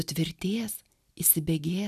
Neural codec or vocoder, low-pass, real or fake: none; 14.4 kHz; real